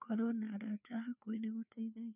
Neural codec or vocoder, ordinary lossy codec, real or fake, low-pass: codec, 16 kHz, 4 kbps, FreqCodec, larger model; none; fake; 3.6 kHz